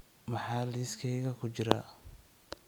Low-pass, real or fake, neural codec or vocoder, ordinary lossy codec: none; real; none; none